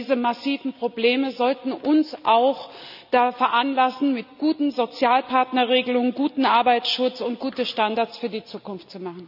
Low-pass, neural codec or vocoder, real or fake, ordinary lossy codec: 5.4 kHz; none; real; none